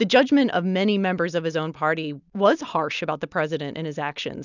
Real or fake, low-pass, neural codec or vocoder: real; 7.2 kHz; none